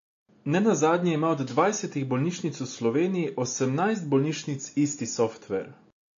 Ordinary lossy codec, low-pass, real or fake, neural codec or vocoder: AAC, 48 kbps; 7.2 kHz; real; none